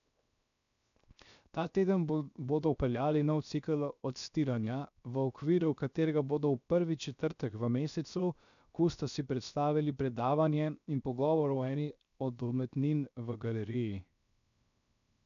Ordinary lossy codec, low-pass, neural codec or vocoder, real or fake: none; 7.2 kHz; codec, 16 kHz, 0.7 kbps, FocalCodec; fake